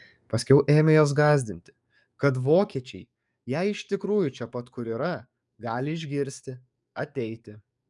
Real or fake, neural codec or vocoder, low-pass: fake; codec, 44.1 kHz, 7.8 kbps, DAC; 10.8 kHz